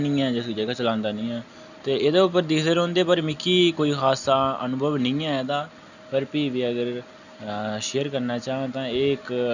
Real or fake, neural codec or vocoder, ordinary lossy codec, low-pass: real; none; none; 7.2 kHz